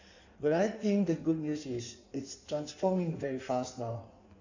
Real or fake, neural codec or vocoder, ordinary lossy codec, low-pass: fake; codec, 16 kHz in and 24 kHz out, 1.1 kbps, FireRedTTS-2 codec; none; 7.2 kHz